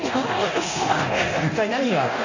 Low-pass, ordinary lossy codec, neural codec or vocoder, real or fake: 7.2 kHz; AAC, 48 kbps; codec, 24 kHz, 0.9 kbps, DualCodec; fake